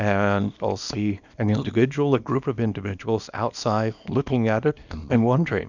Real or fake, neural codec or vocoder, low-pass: fake; codec, 24 kHz, 0.9 kbps, WavTokenizer, small release; 7.2 kHz